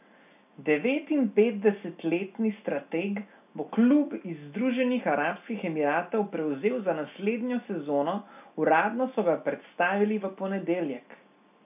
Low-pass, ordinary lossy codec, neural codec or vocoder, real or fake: 3.6 kHz; none; none; real